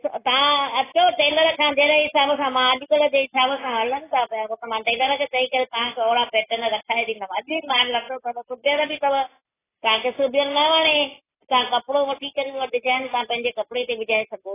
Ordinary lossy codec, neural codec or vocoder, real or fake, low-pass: AAC, 16 kbps; none; real; 3.6 kHz